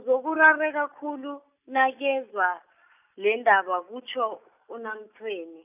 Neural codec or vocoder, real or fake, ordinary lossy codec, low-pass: none; real; none; 3.6 kHz